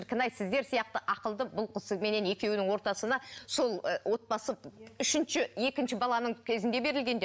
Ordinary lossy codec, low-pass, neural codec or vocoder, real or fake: none; none; none; real